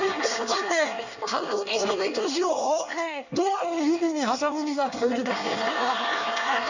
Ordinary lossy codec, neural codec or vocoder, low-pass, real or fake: none; codec, 24 kHz, 1 kbps, SNAC; 7.2 kHz; fake